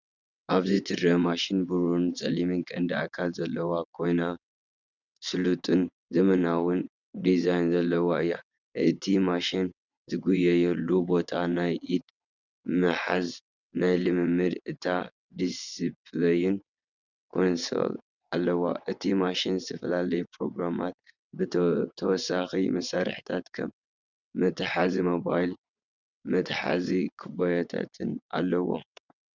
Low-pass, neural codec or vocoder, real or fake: 7.2 kHz; vocoder, 22.05 kHz, 80 mel bands, WaveNeXt; fake